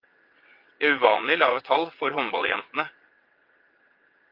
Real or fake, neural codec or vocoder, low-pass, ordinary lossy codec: fake; vocoder, 22.05 kHz, 80 mel bands, WaveNeXt; 5.4 kHz; Opus, 24 kbps